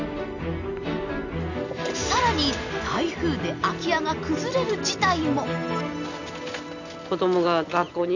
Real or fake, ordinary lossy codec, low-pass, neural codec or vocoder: real; none; 7.2 kHz; none